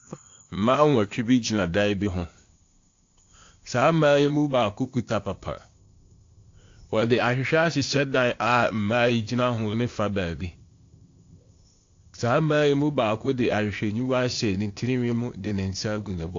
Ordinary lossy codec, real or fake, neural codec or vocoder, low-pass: AAC, 48 kbps; fake; codec, 16 kHz, 0.8 kbps, ZipCodec; 7.2 kHz